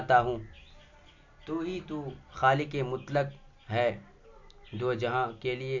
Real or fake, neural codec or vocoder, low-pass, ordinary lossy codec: real; none; 7.2 kHz; MP3, 48 kbps